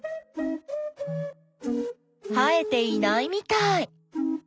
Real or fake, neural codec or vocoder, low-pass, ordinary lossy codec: real; none; none; none